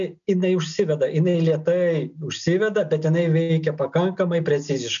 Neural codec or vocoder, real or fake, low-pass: none; real; 7.2 kHz